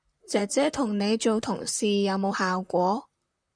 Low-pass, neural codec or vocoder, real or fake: 9.9 kHz; vocoder, 44.1 kHz, 128 mel bands, Pupu-Vocoder; fake